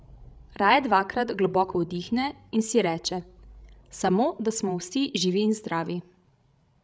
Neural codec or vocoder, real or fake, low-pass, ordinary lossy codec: codec, 16 kHz, 16 kbps, FreqCodec, larger model; fake; none; none